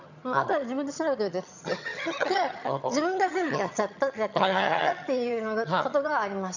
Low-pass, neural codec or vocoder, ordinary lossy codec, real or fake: 7.2 kHz; vocoder, 22.05 kHz, 80 mel bands, HiFi-GAN; none; fake